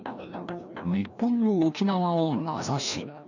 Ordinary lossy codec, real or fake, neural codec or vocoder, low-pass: none; fake; codec, 16 kHz, 1 kbps, FreqCodec, larger model; 7.2 kHz